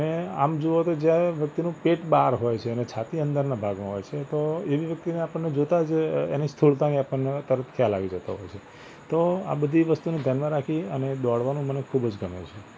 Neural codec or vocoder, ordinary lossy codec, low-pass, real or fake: none; none; none; real